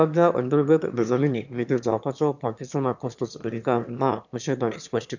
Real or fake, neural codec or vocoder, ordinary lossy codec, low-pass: fake; autoencoder, 22.05 kHz, a latent of 192 numbers a frame, VITS, trained on one speaker; none; 7.2 kHz